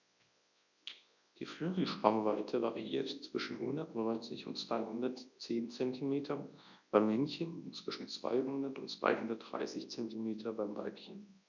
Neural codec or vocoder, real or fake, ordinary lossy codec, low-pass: codec, 24 kHz, 0.9 kbps, WavTokenizer, large speech release; fake; none; 7.2 kHz